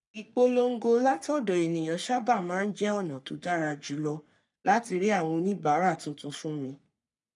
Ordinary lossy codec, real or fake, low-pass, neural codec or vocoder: none; fake; 10.8 kHz; codec, 44.1 kHz, 3.4 kbps, Pupu-Codec